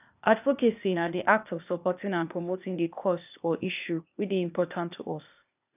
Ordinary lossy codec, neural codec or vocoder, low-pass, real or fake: none; codec, 16 kHz, 0.8 kbps, ZipCodec; 3.6 kHz; fake